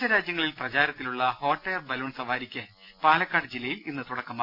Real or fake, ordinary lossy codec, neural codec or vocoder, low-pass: real; none; none; 5.4 kHz